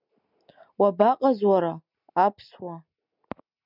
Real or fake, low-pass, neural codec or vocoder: real; 5.4 kHz; none